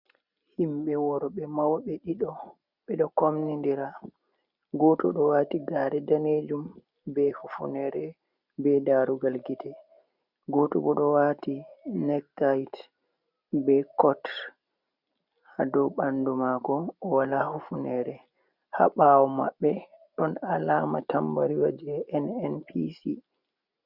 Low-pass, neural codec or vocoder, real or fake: 5.4 kHz; none; real